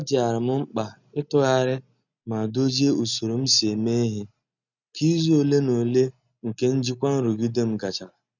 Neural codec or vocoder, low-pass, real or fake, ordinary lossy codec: none; 7.2 kHz; real; none